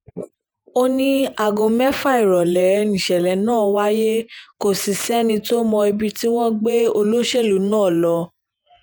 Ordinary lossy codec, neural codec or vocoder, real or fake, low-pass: none; vocoder, 48 kHz, 128 mel bands, Vocos; fake; none